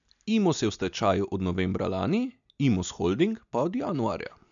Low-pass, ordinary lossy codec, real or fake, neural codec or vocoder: 7.2 kHz; none; real; none